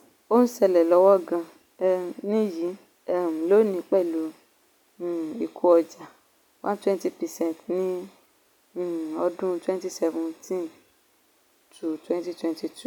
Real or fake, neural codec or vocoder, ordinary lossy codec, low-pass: real; none; none; 19.8 kHz